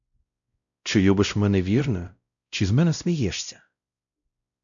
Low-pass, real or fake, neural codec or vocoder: 7.2 kHz; fake; codec, 16 kHz, 0.5 kbps, X-Codec, WavLM features, trained on Multilingual LibriSpeech